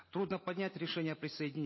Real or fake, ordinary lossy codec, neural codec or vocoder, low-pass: real; MP3, 24 kbps; none; 7.2 kHz